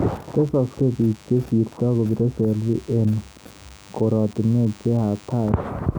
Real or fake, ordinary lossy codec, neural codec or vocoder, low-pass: real; none; none; none